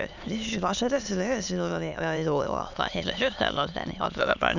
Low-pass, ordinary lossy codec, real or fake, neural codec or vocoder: 7.2 kHz; none; fake; autoencoder, 22.05 kHz, a latent of 192 numbers a frame, VITS, trained on many speakers